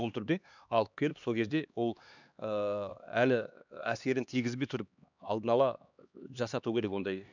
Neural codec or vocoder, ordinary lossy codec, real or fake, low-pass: codec, 16 kHz, 2 kbps, X-Codec, HuBERT features, trained on LibriSpeech; none; fake; 7.2 kHz